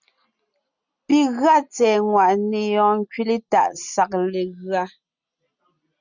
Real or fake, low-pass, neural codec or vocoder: real; 7.2 kHz; none